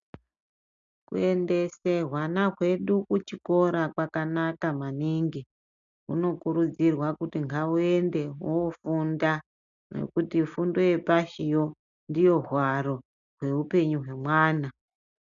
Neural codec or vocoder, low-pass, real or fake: none; 7.2 kHz; real